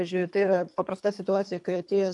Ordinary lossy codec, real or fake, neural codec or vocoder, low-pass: MP3, 96 kbps; fake; codec, 24 kHz, 3 kbps, HILCodec; 10.8 kHz